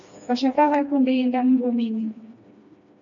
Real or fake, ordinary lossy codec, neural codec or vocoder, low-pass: fake; MP3, 64 kbps; codec, 16 kHz, 2 kbps, FreqCodec, smaller model; 7.2 kHz